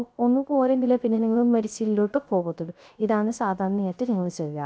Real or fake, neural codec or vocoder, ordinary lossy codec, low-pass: fake; codec, 16 kHz, 0.3 kbps, FocalCodec; none; none